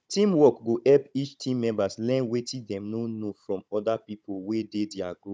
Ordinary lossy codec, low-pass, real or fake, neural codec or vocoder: none; none; fake; codec, 16 kHz, 16 kbps, FunCodec, trained on Chinese and English, 50 frames a second